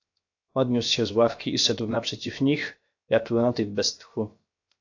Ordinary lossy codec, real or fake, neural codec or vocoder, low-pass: AAC, 48 kbps; fake; codec, 16 kHz, 0.7 kbps, FocalCodec; 7.2 kHz